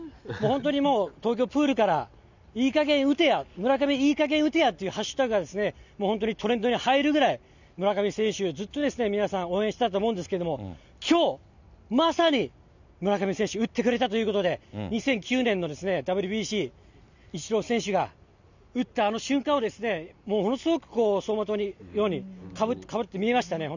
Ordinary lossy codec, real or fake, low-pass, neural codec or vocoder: none; real; 7.2 kHz; none